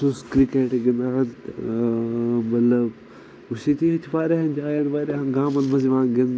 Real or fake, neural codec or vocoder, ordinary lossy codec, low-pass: real; none; none; none